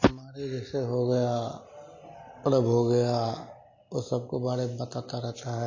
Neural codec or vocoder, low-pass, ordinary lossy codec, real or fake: none; 7.2 kHz; MP3, 32 kbps; real